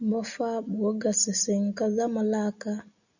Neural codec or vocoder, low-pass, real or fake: none; 7.2 kHz; real